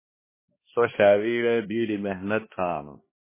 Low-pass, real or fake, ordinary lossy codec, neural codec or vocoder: 3.6 kHz; fake; MP3, 16 kbps; codec, 16 kHz, 1 kbps, X-Codec, HuBERT features, trained on balanced general audio